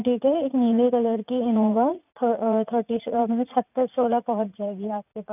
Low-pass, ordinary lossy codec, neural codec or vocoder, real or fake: 3.6 kHz; none; vocoder, 44.1 kHz, 80 mel bands, Vocos; fake